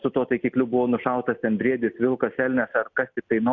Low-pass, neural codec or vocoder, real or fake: 7.2 kHz; none; real